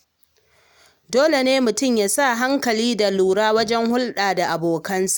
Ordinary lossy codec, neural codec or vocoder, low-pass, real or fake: none; none; none; real